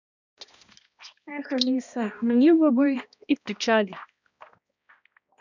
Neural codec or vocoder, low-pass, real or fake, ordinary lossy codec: codec, 16 kHz, 1 kbps, X-Codec, HuBERT features, trained on balanced general audio; 7.2 kHz; fake; none